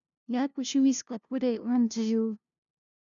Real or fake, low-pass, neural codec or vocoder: fake; 7.2 kHz; codec, 16 kHz, 0.5 kbps, FunCodec, trained on LibriTTS, 25 frames a second